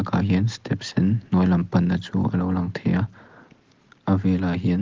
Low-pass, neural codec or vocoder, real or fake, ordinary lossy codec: 7.2 kHz; none; real; Opus, 16 kbps